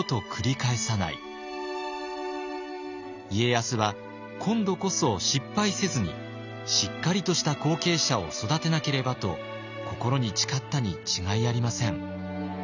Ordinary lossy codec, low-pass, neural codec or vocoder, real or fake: none; 7.2 kHz; none; real